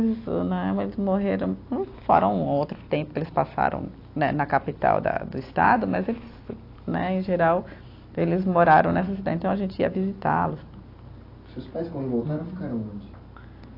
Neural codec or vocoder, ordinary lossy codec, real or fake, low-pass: none; AAC, 32 kbps; real; 5.4 kHz